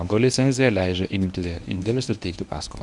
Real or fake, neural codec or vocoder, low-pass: fake; codec, 24 kHz, 0.9 kbps, WavTokenizer, medium speech release version 1; 10.8 kHz